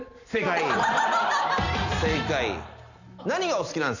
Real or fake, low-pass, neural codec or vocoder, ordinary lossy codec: real; 7.2 kHz; none; none